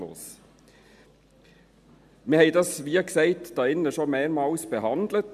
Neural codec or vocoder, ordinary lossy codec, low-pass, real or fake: none; AAC, 96 kbps; 14.4 kHz; real